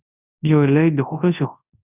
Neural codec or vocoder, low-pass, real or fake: codec, 24 kHz, 0.9 kbps, WavTokenizer, large speech release; 3.6 kHz; fake